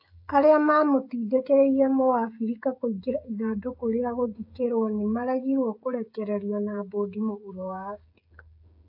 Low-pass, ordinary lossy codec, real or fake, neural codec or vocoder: 5.4 kHz; AAC, 48 kbps; fake; codec, 16 kHz, 16 kbps, FreqCodec, smaller model